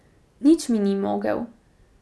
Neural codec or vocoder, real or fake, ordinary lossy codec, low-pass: none; real; none; none